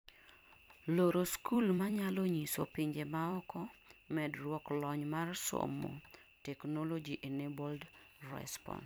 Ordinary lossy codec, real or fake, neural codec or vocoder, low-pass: none; fake; vocoder, 44.1 kHz, 128 mel bands every 256 samples, BigVGAN v2; none